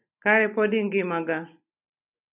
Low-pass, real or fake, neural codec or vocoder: 3.6 kHz; real; none